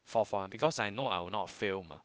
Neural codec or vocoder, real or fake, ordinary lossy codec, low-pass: codec, 16 kHz, 0.8 kbps, ZipCodec; fake; none; none